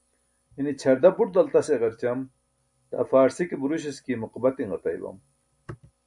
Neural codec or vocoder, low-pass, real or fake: none; 10.8 kHz; real